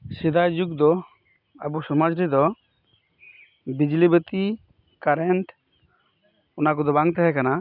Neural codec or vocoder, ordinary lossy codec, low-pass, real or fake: none; none; 5.4 kHz; real